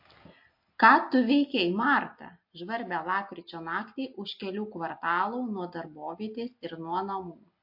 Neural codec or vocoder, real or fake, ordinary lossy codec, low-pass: none; real; MP3, 32 kbps; 5.4 kHz